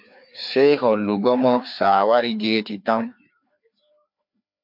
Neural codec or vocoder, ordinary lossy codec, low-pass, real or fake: codec, 16 kHz, 2 kbps, FreqCodec, larger model; MP3, 48 kbps; 5.4 kHz; fake